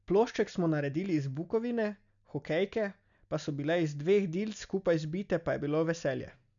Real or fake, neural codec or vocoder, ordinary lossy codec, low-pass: real; none; none; 7.2 kHz